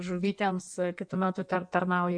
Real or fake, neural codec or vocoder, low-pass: fake; codec, 16 kHz in and 24 kHz out, 1.1 kbps, FireRedTTS-2 codec; 9.9 kHz